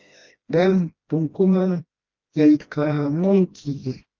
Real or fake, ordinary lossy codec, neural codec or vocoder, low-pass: fake; Opus, 32 kbps; codec, 16 kHz, 1 kbps, FreqCodec, smaller model; 7.2 kHz